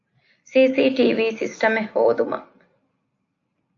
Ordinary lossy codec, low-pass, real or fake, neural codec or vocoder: AAC, 48 kbps; 7.2 kHz; real; none